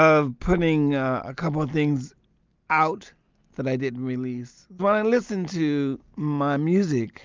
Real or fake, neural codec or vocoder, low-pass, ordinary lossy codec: real; none; 7.2 kHz; Opus, 24 kbps